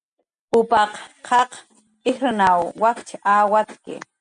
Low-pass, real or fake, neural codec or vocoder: 9.9 kHz; real; none